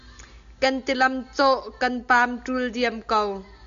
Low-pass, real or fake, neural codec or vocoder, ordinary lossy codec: 7.2 kHz; real; none; AAC, 96 kbps